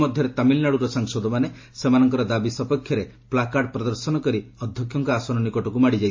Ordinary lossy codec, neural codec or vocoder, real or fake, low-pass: MP3, 32 kbps; none; real; 7.2 kHz